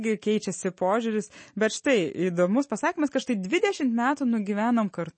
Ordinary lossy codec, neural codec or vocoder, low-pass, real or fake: MP3, 32 kbps; none; 9.9 kHz; real